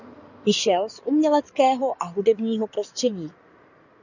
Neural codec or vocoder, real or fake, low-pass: codec, 16 kHz in and 24 kHz out, 2.2 kbps, FireRedTTS-2 codec; fake; 7.2 kHz